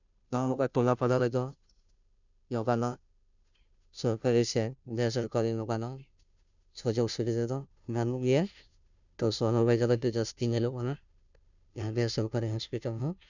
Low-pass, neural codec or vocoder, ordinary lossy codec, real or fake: 7.2 kHz; codec, 16 kHz, 0.5 kbps, FunCodec, trained on Chinese and English, 25 frames a second; none; fake